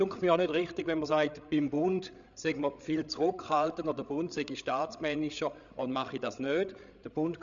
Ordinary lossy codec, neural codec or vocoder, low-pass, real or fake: none; codec, 16 kHz, 8 kbps, FreqCodec, larger model; 7.2 kHz; fake